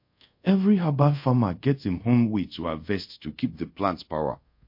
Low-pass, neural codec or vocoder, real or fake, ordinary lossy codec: 5.4 kHz; codec, 24 kHz, 0.5 kbps, DualCodec; fake; MP3, 32 kbps